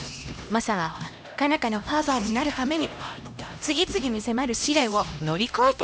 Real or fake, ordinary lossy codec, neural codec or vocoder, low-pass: fake; none; codec, 16 kHz, 1 kbps, X-Codec, HuBERT features, trained on LibriSpeech; none